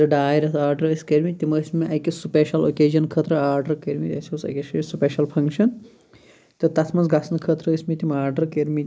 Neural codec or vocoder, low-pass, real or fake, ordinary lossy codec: none; none; real; none